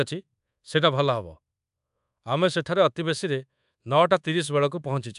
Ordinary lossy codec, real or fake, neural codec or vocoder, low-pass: AAC, 96 kbps; fake; codec, 24 kHz, 1.2 kbps, DualCodec; 10.8 kHz